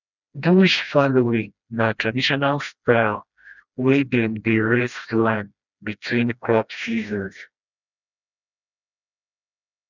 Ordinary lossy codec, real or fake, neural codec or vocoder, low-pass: none; fake; codec, 16 kHz, 1 kbps, FreqCodec, smaller model; 7.2 kHz